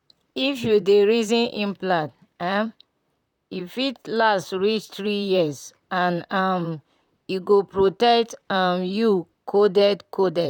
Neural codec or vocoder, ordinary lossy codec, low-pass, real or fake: vocoder, 44.1 kHz, 128 mel bands, Pupu-Vocoder; none; 19.8 kHz; fake